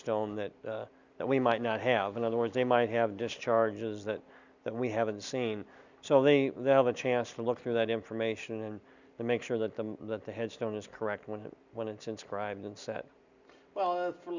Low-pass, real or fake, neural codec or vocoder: 7.2 kHz; real; none